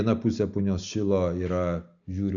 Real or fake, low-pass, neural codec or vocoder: real; 7.2 kHz; none